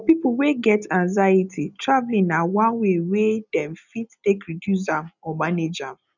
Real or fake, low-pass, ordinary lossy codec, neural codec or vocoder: real; 7.2 kHz; none; none